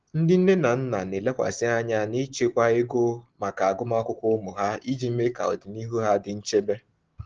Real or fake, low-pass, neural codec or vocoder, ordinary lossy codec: real; 7.2 kHz; none; Opus, 16 kbps